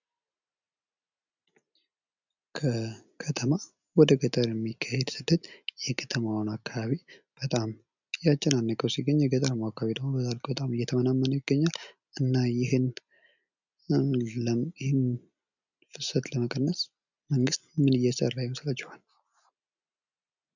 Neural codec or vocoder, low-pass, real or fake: none; 7.2 kHz; real